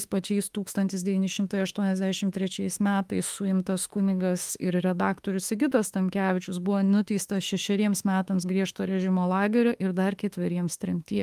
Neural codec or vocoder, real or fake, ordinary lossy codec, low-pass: autoencoder, 48 kHz, 32 numbers a frame, DAC-VAE, trained on Japanese speech; fake; Opus, 32 kbps; 14.4 kHz